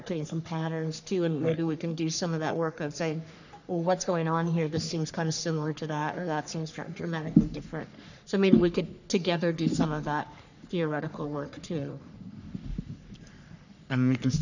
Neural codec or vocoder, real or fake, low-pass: codec, 44.1 kHz, 3.4 kbps, Pupu-Codec; fake; 7.2 kHz